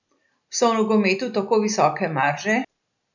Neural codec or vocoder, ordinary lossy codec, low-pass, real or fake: none; none; 7.2 kHz; real